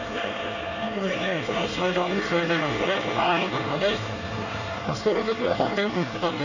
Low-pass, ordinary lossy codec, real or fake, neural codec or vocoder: 7.2 kHz; none; fake; codec, 24 kHz, 1 kbps, SNAC